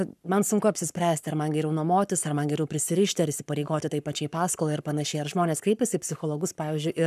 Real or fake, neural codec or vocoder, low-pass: fake; codec, 44.1 kHz, 7.8 kbps, Pupu-Codec; 14.4 kHz